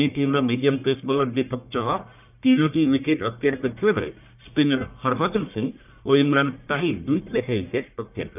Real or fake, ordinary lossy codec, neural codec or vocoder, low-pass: fake; none; codec, 44.1 kHz, 1.7 kbps, Pupu-Codec; 3.6 kHz